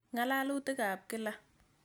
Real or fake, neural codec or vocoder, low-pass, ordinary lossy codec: real; none; none; none